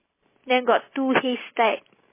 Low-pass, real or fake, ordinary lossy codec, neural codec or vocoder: 3.6 kHz; real; MP3, 16 kbps; none